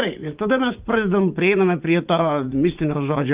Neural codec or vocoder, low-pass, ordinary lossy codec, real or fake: vocoder, 44.1 kHz, 80 mel bands, Vocos; 3.6 kHz; Opus, 32 kbps; fake